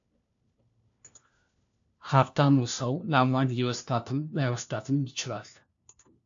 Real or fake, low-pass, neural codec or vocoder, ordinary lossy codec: fake; 7.2 kHz; codec, 16 kHz, 1 kbps, FunCodec, trained on LibriTTS, 50 frames a second; AAC, 64 kbps